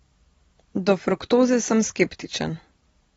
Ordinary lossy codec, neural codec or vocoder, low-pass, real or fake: AAC, 24 kbps; none; 10.8 kHz; real